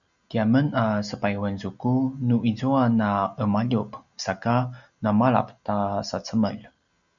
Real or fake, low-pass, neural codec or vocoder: real; 7.2 kHz; none